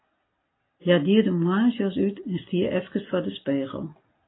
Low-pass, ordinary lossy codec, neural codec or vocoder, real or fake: 7.2 kHz; AAC, 16 kbps; none; real